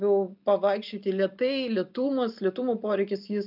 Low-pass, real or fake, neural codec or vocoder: 5.4 kHz; real; none